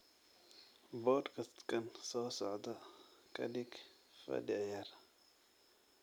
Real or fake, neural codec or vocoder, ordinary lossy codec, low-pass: real; none; none; none